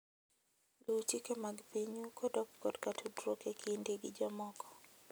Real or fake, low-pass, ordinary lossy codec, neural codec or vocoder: real; none; none; none